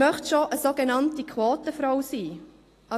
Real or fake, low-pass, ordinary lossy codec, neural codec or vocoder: real; 14.4 kHz; AAC, 48 kbps; none